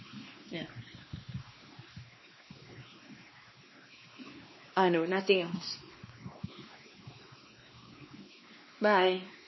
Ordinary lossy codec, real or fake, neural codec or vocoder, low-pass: MP3, 24 kbps; fake; codec, 16 kHz, 2 kbps, X-Codec, WavLM features, trained on Multilingual LibriSpeech; 7.2 kHz